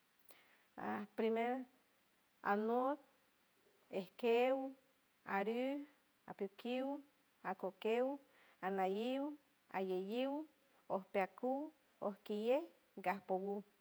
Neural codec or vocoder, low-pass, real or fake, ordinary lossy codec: vocoder, 48 kHz, 128 mel bands, Vocos; none; fake; none